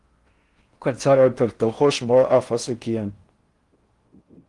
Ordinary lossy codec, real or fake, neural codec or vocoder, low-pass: Opus, 24 kbps; fake; codec, 16 kHz in and 24 kHz out, 0.6 kbps, FocalCodec, streaming, 4096 codes; 10.8 kHz